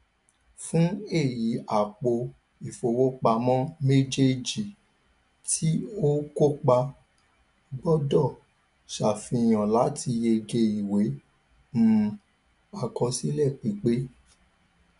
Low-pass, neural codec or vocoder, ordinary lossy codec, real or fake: 10.8 kHz; none; none; real